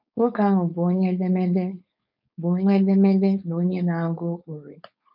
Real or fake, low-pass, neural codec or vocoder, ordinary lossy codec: fake; 5.4 kHz; codec, 16 kHz, 4.8 kbps, FACodec; none